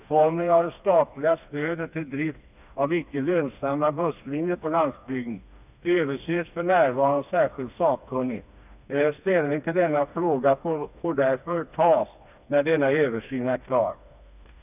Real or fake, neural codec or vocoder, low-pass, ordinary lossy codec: fake; codec, 16 kHz, 2 kbps, FreqCodec, smaller model; 3.6 kHz; none